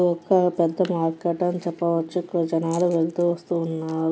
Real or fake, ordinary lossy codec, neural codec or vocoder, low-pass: real; none; none; none